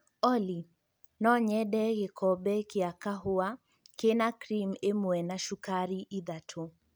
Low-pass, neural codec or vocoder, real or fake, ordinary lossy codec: none; none; real; none